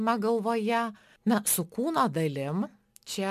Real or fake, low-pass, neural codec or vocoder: real; 14.4 kHz; none